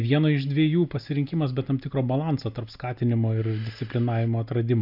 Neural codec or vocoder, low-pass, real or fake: none; 5.4 kHz; real